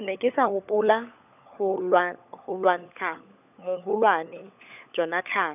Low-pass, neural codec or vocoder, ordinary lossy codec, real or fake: 3.6 kHz; codec, 16 kHz, 16 kbps, FunCodec, trained on LibriTTS, 50 frames a second; none; fake